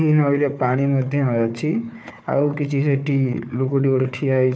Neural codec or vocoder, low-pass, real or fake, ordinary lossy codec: codec, 16 kHz, 4 kbps, FunCodec, trained on Chinese and English, 50 frames a second; none; fake; none